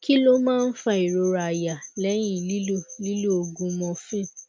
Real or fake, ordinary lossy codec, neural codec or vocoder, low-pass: real; none; none; none